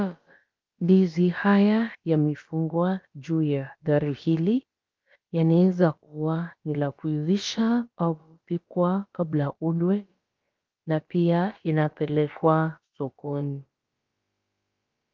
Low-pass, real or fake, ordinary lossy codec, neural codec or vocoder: 7.2 kHz; fake; Opus, 32 kbps; codec, 16 kHz, about 1 kbps, DyCAST, with the encoder's durations